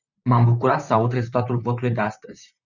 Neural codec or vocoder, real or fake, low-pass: vocoder, 44.1 kHz, 128 mel bands, Pupu-Vocoder; fake; 7.2 kHz